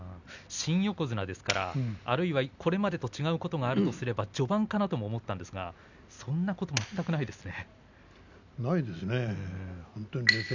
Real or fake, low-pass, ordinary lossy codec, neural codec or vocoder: real; 7.2 kHz; none; none